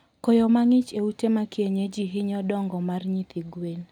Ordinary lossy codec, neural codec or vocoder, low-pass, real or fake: none; none; 19.8 kHz; real